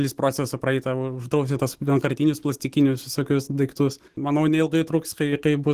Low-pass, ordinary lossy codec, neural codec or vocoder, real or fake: 14.4 kHz; Opus, 16 kbps; autoencoder, 48 kHz, 128 numbers a frame, DAC-VAE, trained on Japanese speech; fake